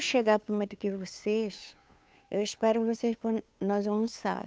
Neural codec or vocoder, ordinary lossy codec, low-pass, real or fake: codec, 16 kHz, 2 kbps, FunCodec, trained on Chinese and English, 25 frames a second; none; none; fake